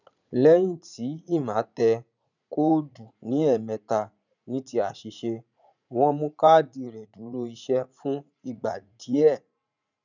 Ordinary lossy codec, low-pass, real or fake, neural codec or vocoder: none; 7.2 kHz; fake; vocoder, 44.1 kHz, 80 mel bands, Vocos